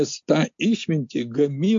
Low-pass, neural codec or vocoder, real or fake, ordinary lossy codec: 7.2 kHz; none; real; MP3, 48 kbps